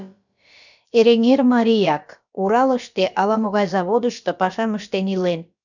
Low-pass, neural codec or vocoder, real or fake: 7.2 kHz; codec, 16 kHz, about 1 kbps, DyCAST, with the encoder's durations; fake